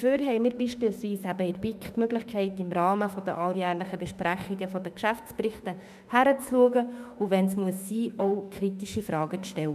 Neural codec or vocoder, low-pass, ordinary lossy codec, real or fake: autoencoder, 48 kHz, 32 numbers a frame, DAC-VAE, trained on Japanese speech; 14.4 kHz; none; fake